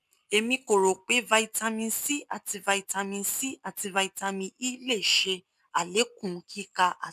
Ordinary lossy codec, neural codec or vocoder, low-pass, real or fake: none; none; 14.4 kHz; real